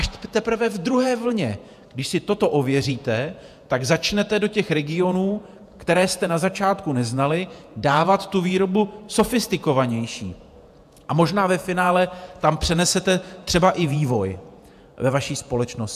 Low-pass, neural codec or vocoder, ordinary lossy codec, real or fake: 14.4 kHz; vocoder, 48 kHz, 128 mel bands, Vocos; AAC, 96 kbps; fake